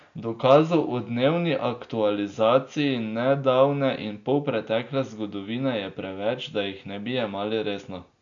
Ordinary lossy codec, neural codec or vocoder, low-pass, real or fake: none; none; 7.2 kHz; real